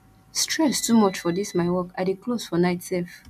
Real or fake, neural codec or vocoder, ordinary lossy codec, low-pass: real; none; none; 14.4 kHz